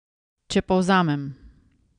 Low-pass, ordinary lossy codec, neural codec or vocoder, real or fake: 9.9 kHz; none; none; real